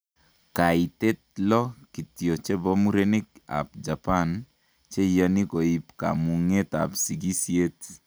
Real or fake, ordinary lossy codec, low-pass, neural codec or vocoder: real; none; none; none